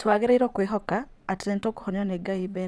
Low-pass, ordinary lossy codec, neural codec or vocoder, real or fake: none; none; vocoder, 22.05 kHz, 80 mel bands, WaveNeXt; fake